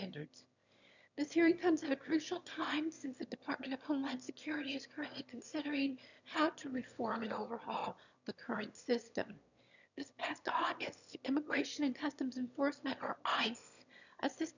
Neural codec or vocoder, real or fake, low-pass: autoencoder, 22.05 kHz, a latent of 192 numbers a frame, VITS, trained on one speaker; fake; 7.2 kHz